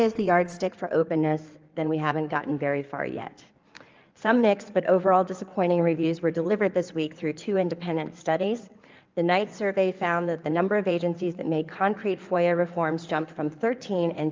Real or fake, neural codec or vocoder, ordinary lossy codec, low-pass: fake; codec, 16 kHz in and 24 kHz out, 2.2 kbps, FireRedTTS-2 codec; Opus, 24 kbps; 7.2 kHz